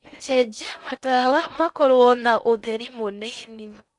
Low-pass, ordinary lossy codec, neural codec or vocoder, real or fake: 10.8 kHz; none; codec, 16 kHz in and 24 kHz out, 0.6 kbps, FocalCodec, streaming, 4096 codes; fake